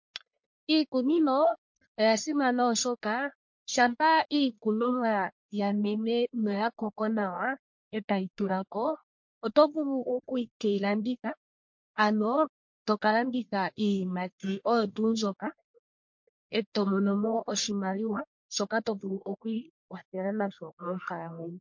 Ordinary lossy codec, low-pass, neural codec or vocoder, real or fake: MP3, 48 kbps; 7.2 kHz; codec, 44.1 kHz, 1.7 kbps, Pupu-Codec; fake